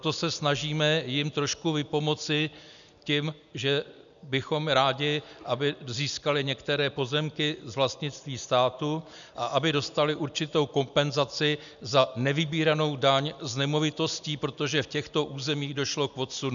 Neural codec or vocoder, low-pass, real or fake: none; 7.2 kHz; real